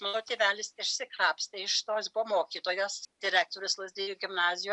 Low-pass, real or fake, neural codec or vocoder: 10.8 kHz; real; none